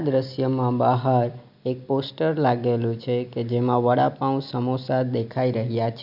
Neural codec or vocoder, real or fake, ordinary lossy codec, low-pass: none; real; none; 5.4 kHz